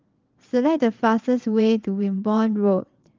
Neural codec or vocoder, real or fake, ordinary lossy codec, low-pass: vocoder, 22.05 kHz, 80 mel bands, WaveNeXt; fake; Opus, 32 kbps; 7.2 kHz